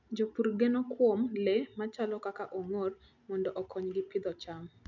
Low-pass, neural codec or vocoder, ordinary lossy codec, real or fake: 7.2 kHz; none; none; real